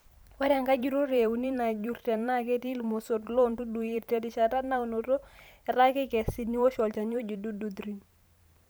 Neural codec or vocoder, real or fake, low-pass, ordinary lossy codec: vocoder, 44.1 kHz, 128 mel bands every 512 samples, BigVGAN v2; fake; none; none